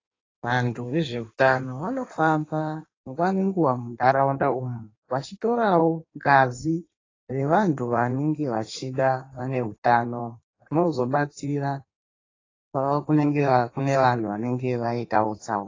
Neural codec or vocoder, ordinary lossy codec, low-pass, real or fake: codec, 16 kHz in and 24 kHz out, 1.1 kbps, FireRedTTS-2 codec; AAC, 32 kbps; 7.2 kHz; fake